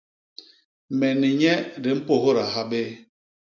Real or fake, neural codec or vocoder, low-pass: real; none; 7.2 kHz